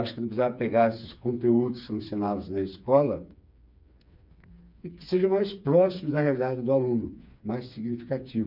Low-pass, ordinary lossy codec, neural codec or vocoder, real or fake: 5.4 kHz; none; codec, 16 kHz, 4 kbps, FreqCodec, smaller model; fake